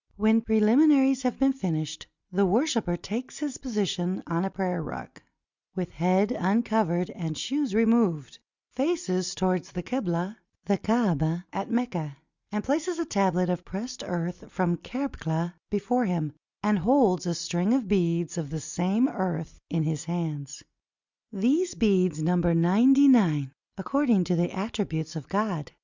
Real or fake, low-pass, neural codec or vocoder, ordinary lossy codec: real; 7.2 kHz; none; Opus, 64 kbps